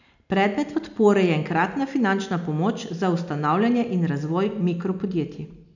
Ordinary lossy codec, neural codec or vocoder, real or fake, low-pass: none; none; real; 7.2 kHz